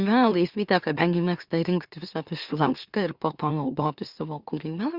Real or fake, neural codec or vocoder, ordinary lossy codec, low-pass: fake; autoencoder, 44.1 kHz, a latent of 192 numbers a frame, MeloTTS; Opus, 64 kbps; 5.4 kHz